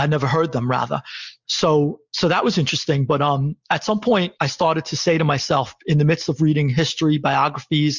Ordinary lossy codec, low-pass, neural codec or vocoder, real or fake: Opus, 64 kbps; 7.2 kHz; none; real